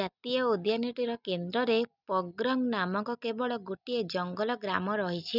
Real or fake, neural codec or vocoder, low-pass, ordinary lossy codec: real; none; 5.4 kHz; none